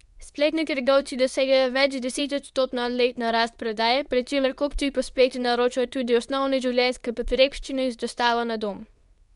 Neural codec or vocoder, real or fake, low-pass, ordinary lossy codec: codec, 24 kHz, 0.9 kbps, WavTokenizer, medium speech release version 2; fake; 10.8 kHz; none